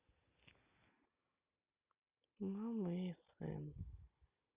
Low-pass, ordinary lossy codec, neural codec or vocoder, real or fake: 3.6 kHz; Opus, 64 kbps; none; real